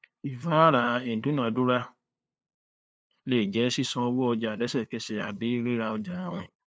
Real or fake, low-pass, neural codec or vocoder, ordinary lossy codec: fake; none; codec, 16 kHz, 2 kbps, FunCodec, trained on LibriTTS, 25 frames a second; none